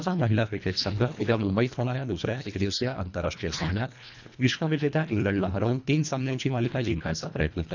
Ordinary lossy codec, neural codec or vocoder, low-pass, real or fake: none; codec, 24 kHz, 1.5 kbps, HILCodec; 7.2 kHz; fake